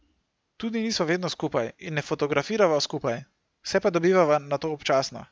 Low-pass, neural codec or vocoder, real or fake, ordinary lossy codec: none; none; real; none